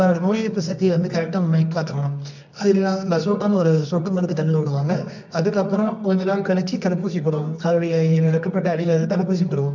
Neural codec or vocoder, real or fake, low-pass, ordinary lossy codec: codec, 24 kHz, 0.9 kbps, WavTokenizer, medium music audio release; fake; 7.2 kHz; none